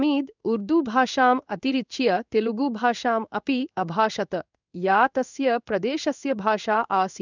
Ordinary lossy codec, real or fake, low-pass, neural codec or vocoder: none; fake; 7.2 kHz; codec, 16 kHz in and 24 kHz out, 1 kbps, XY-Tokenizer